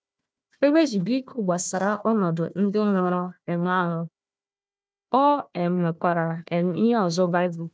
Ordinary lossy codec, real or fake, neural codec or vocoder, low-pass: none; fake; codec, 16 kHz, 1 kbps, FunCodec, trained on Chinese and English, 50 frames a second; none